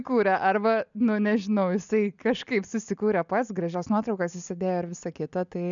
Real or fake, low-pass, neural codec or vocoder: real; 7.2 kHz; none